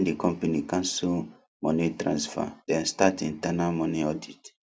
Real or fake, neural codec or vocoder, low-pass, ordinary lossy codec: real; none; none; none